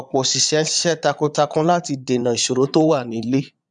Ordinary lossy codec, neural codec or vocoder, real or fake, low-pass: none; vocoder, 22.05 kHz, 80 mel bands, Vocos; fake; 9.9 kHz